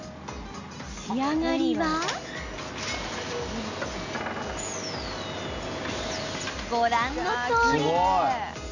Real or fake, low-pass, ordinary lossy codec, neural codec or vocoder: real; 7.2 kHz; none; none